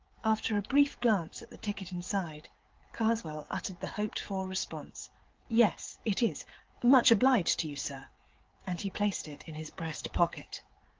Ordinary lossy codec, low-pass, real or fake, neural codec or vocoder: Opus, 16 kbps; 7.2 kHz; fake; codec, 16 kHz, 16 kbps, FreqCodec, smaller model